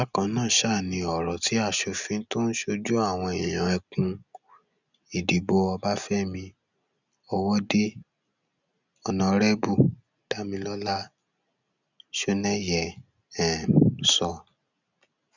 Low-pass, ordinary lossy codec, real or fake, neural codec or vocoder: 7.2 kHz; none; real; none